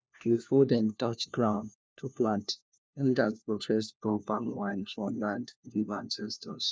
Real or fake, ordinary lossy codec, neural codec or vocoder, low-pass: fake; none; codec, 16 kHz, 1 kbps, FunCodec, trained on LibriTTS, 50 frames a second; none